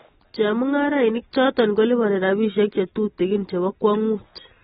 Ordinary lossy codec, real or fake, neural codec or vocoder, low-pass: AAC, 16 kbps; real; none; 19.8 kHz